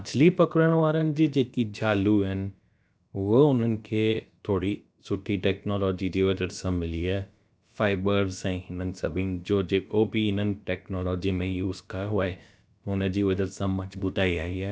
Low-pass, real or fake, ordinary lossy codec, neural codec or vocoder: none; fake; none; codec, 16 kHz, about 1 kbps, DyCAST, with the encoder's durations